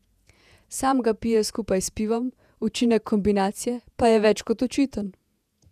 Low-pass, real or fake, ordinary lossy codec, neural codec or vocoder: 14.4 kHz; fake; none; vocoder, 48 kHz, 128 mel bands, Vocos